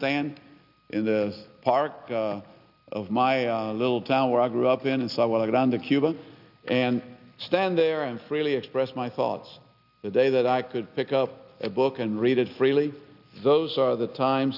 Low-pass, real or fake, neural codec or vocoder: 5.4 kHz; real; none